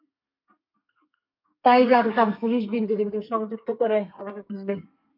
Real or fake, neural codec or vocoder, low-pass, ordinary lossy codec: fake; codec, 32 kHz, 1.9 kbps, SNAC; 5.4 kHz; MP3, 48 kbps